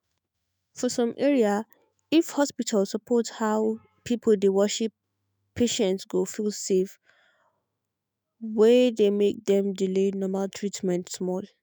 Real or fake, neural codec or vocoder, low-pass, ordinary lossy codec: fake; autoencoder, 48 kHz, 128 numbers a frame, DAC-VAE, trained on Japanese speech; none; none